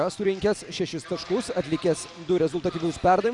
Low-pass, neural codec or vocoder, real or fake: 10.8 kHz; none; real